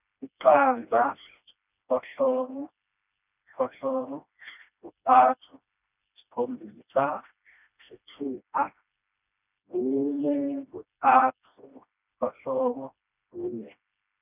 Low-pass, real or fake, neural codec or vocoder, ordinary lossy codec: 3.6 kHz; fake; codec, 16 kHz, 1 kbps, FreqCodec, smaller model; none